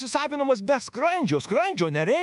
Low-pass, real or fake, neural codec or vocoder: 10.8 kHz; fake; codec, 24 kHz, 1.2 kbps, DualCodec